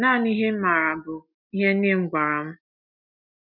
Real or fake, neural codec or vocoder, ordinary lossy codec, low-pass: real; none; none; 5.4 kHz